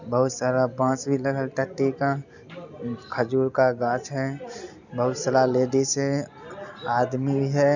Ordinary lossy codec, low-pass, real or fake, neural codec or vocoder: none; 7.2 kHz; real; none